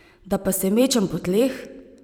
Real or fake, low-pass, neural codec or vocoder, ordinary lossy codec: fake; none; vocoder, 44.1 kHz, 128 mel bands every 512 samples, BigVGAN v2; none